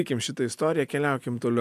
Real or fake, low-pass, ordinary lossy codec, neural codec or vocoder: real; 14.4 kHz; AAC, 96 kbps; none